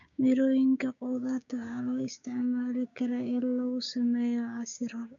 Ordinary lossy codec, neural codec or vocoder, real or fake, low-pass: none; codec, 16 kHz, 6 kbps, DAC; fake; 7.2 kHz